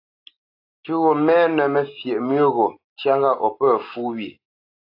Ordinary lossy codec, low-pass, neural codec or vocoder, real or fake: AAC, 32 kbps; 5.4 kHz; none; real